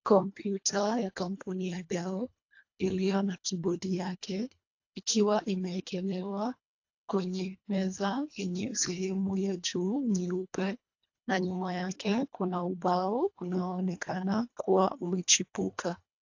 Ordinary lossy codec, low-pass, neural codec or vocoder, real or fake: AAC, 48 kbps; 7.2 kHz; codec, 24 kHz, 1.5 kbps, HILCodec; fake